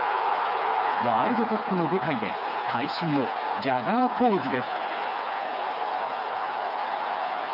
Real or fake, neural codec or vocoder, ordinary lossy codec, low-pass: fake; codec, 16 kHz, 4 kbps, FreqCodec, smaller model; none; 5.4 kHz